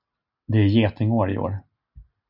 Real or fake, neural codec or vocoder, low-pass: real; none; 5.4 kHz